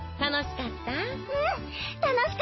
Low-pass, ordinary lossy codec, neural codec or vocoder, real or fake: 7.2 kHz; MP3, 24 kbps; none; real